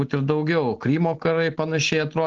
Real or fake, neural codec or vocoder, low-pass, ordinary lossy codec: real; none; 7.2 kHz; Opus, 16 kbps